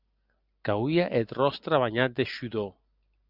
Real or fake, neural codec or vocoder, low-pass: real; none; 5.4 kHz